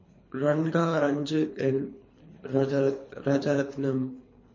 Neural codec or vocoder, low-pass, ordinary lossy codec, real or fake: codec, 24 kHz, 3 kbps, HILCodec; 7.2 kHz; MP3, 32 kbps; fake